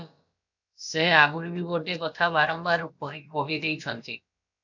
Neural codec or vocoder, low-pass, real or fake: codec, 16 kHz, about 1 kbps, DyCAST, with the encoder's durations; 7.2 kHz; fake